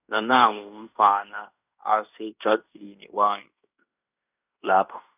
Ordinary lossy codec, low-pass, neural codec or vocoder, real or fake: none; 3.6 kHz; codec, 16 kHz in and 24 kHz out, 0.9 kbps, LongCat-Audio-Codec, fine tuned four codebook decoder; fake